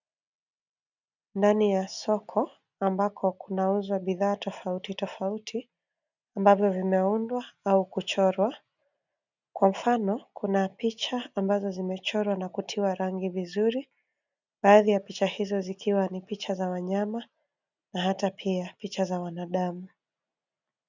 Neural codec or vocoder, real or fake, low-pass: none; real; 7.2 kHz